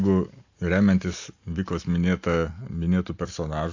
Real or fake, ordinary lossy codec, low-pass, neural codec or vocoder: real; AAC, 48 kbps; 7.2 kHz; none